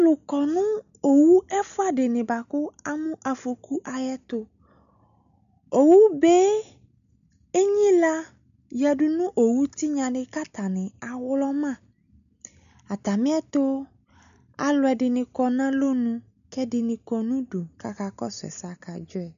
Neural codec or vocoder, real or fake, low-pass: none; real; 7.2 kHz